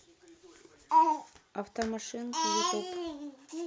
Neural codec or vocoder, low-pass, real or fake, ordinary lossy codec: none; none; real; none